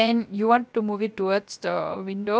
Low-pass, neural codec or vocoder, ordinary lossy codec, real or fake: none; codec, 16 kHz, 0.7 kbps, FocalCodec; none; fake